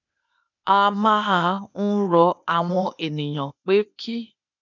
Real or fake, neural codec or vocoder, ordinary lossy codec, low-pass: fake; codec, 16 kHz, 0.8 kbps, ZipCodec; none; 7.2 kHz